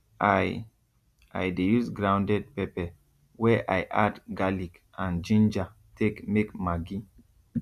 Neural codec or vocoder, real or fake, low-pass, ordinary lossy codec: none; real; 14.4 kHz; none